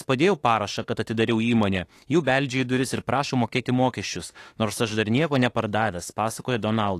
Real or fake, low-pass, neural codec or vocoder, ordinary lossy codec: fake; 14.4 kHz; autoencoder, 48 kHz, 32 numbers a frame, DAC-VAE, trained on Japanese speech; AAC, 48 kbps